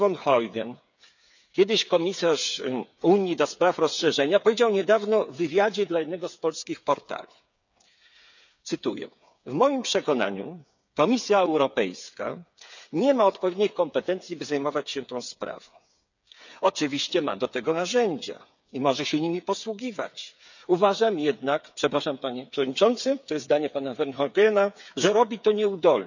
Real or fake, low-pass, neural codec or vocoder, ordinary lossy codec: fake; 7.2 kHz; codec, 16 kHz, 8 kbps, FreqCodec, smaller model; none